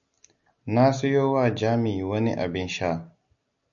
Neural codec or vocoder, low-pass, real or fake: none; 7.2 kHz; real